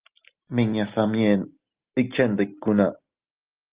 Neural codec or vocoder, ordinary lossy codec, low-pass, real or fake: none; Opus, 32 kbps; 3.6 kHz; real